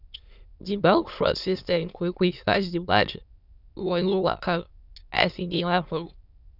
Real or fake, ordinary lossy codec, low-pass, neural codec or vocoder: fake; none; 5.4 kHz; autoencoder, 22.05 kHz, a latent of 192 numbers a frame, VITS, trained on many speakers